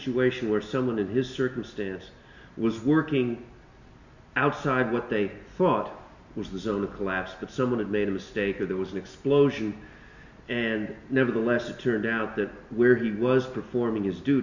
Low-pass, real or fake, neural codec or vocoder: 7.2 kHz; real; none